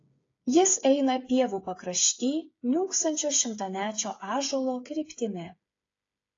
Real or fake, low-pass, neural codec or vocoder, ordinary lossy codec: fake; 7.2 kHz; codec, 16 kHz, 8 kbps, FreqCodec, smaller model; AAC, 32 kbps